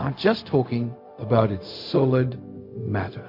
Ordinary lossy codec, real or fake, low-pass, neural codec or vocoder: MP3, 32 kbps; fake; 5.4 kHz; codec, 16 kHz, 0.4 kbps, LongCat-Audio-Codec